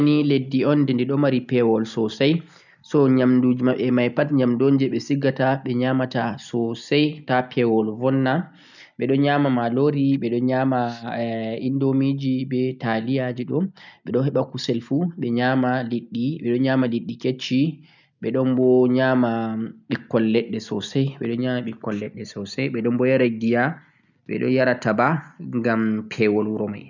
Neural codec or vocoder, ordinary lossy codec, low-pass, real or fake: none; none; 7.2 kHz; real